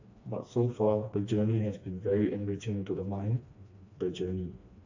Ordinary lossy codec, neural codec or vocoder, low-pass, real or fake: AAC, 48 kbps; codec, 16 kHz, 2 kbps, FreqCodec, smaller model; 7.2 kHz; fake